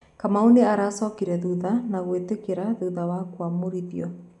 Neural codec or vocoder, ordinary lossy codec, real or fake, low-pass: none; none; real; 10.8 kHz